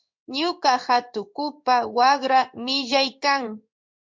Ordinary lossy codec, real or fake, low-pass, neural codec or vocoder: MP3, 64 kbps; fake; 7.2 kHz; codec, 16 kHz in and 24 kHz out, 1 kbps, XY-Tokenizer